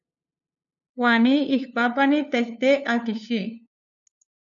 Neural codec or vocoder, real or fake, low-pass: codec, 16 kHz, 2 kbps, FunCodec, trained on LibriTTS, 25 frames a second; fake; 7.2 kHz